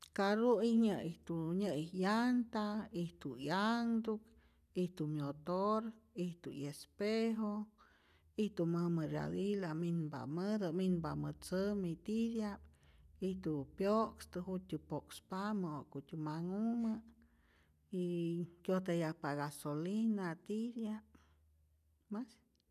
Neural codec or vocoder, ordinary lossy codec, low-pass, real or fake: none; none; 14.4 kHz; real